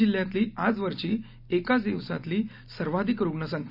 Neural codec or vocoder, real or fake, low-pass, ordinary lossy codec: none; real; 5.4 kHz; none